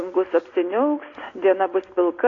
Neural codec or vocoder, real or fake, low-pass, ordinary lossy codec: none; real; 7.2 kHz; AAC, 32 kbps